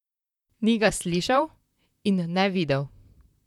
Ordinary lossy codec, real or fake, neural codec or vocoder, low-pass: none; fake; vocoder, 44.1 kHz, 128 mel bands, Pupu-Vocoder; 19.8 kHz